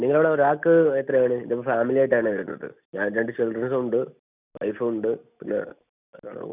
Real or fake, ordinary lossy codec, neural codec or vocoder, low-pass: real; none; none; 3.6 kHz